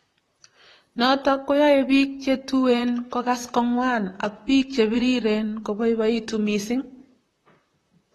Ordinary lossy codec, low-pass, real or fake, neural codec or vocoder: AAC, 32 kbps; 19.8 kHz; fake; vocoder, 44.1 kHz, 128 mel bands, Pupu-Vocoder